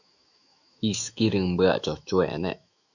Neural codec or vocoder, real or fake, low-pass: autoencoder, 48 kHz, 128 numbers a frame, DAC-VAE, trained on Japanese speech; fake; 7.2 kHz